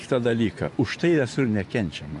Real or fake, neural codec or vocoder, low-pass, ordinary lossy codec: fake; vocoder, 24 kHz, 100 mel bands, Vocos; 10.8 kHz; AAC, 64 kbps